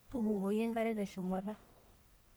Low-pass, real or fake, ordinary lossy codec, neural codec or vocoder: none; fake; none; codec, 44.1 kHz, 1.7 kbps, Pupu-Codec